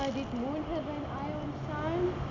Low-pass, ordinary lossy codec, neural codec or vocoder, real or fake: 7.2 kHz; none; none; real